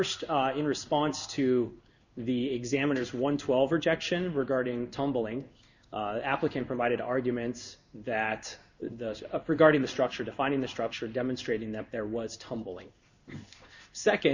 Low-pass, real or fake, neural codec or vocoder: 7.2 kHz; fake; codec, 16 kHz in and 24 kHz out, 1 kbps, XY-Tokenizer